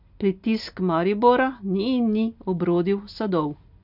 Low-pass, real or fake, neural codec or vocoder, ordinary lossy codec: 5.4 kHz; real; none; none